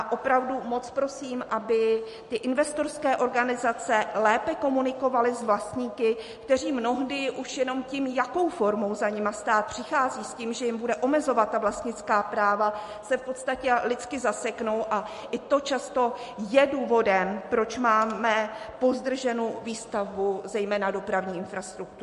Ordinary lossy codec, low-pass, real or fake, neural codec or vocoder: MP3, 48 kbps; 14.4 kHz; real; none